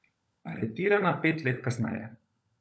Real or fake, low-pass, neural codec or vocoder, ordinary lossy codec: fake; none; codec, 16 kHz, 16 kbps, FunCodec, trained on LibriTTS, 50 frames a second; none